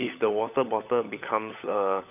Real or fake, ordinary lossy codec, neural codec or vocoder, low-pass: fake; none; codec, 16 kHz, 16 kbps, FunCodec, trained on LibriTTS, 50 frames a second; 3.6 kHz